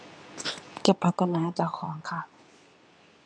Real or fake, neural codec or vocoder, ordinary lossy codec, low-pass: fake; codec, 24 kHz, 0.9 kbps, WavTokenizer, medium speech release version 2; none; 9.9 kHz